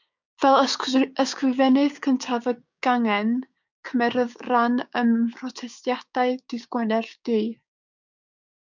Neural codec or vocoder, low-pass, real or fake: codec, 16 kHz, 6 kbps, DAC; 7.2 kHz; fake